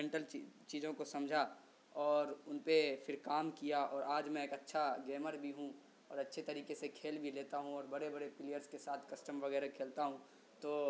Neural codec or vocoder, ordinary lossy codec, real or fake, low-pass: none; none; real; none